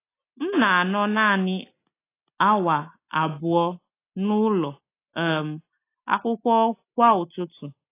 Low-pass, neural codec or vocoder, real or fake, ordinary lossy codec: 3.6 kHz; none; real; AAC, 24 kbps